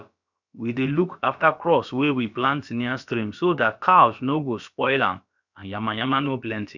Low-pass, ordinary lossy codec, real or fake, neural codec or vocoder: 7.2 kHz; none; fake; codec, 16 kHz, about 1 kbps, DyCAST, with the encoder's durations